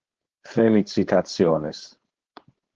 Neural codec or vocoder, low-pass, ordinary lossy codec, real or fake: codec, 16 kHz, 4.8 kbps, FACodec; 7.2 kHz; Opus, 16 kbps; fake